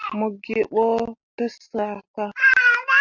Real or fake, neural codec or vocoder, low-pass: real; none; 7.2 kHz